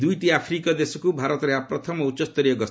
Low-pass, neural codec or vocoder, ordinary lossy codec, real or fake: none; none; none; real